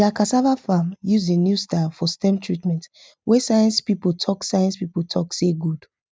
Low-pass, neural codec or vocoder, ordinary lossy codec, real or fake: none; none; none; real